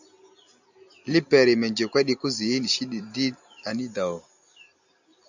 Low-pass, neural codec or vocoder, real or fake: 7.2 kHz; none; real